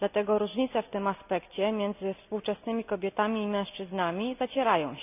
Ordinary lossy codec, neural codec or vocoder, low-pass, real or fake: none; none; 3.6 kHz; real